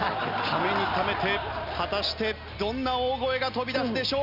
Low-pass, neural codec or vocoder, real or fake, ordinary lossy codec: 5.4 kHz; none; real; none